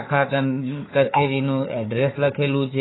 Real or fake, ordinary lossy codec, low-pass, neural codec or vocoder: fake; AAC, 16 kbps; 7.2 kHz; codec, 16 kHz, 4 kbps, FunCodec, trained on Chinese and English, 50 frames a second